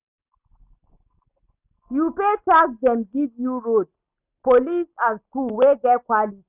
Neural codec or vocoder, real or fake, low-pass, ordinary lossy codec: none; real; 3.6 kHz; none